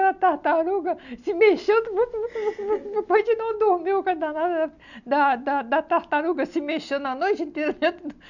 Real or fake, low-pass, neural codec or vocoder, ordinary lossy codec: real; 7.2 kHz; none; none